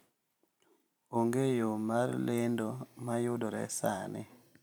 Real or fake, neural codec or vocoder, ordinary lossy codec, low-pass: fake; vocoder, 44.1 kHz, 128 mel bands every 512 samples, BigVGAN v2; none; none